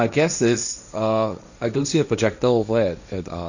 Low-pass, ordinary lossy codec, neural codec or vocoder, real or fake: 7.2 kHz; none; codec, 16 kHz, 1.1 kbps, Voila-Tokenizer; fake